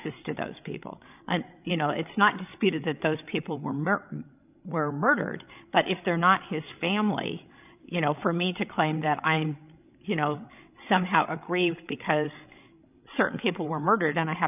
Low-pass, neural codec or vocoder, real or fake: 3.6 kHz; codec, 16 kHz, 16 kbps, FreqCodec, larger model; fake